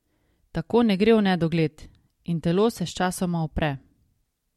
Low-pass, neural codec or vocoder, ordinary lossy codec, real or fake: 19.8 kHz; none; MP3, 64 kbps; real